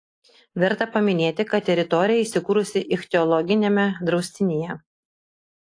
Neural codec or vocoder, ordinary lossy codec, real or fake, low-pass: none; AAC, 48 kbps; real; 9.9 kHz